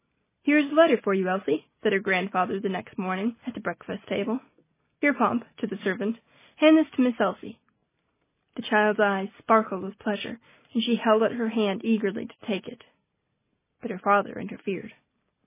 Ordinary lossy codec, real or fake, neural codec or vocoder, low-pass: MP3, 16 kbps; real; none; 3.6 kHz